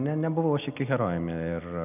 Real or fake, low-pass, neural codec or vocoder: real; 3.6 kHz; none